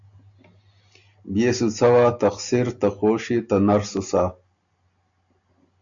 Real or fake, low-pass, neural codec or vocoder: real; 7.2 kHz; none